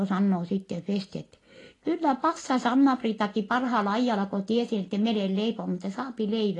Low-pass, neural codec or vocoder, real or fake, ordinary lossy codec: 10.8 kHz; none; real; AAC, 32 kbps